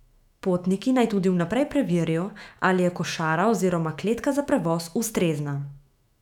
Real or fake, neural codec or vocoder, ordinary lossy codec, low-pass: fake; autoencoder, 48 kHz, 128 numbers a frame, DAC-VAE, trained on Japanese speech; none; 19.8 kHz